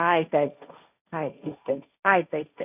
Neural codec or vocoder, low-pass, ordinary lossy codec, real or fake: codec, 16 kHz, 1.1 kbps, Voila-Tokenizer; 3.6 kHz; none; fake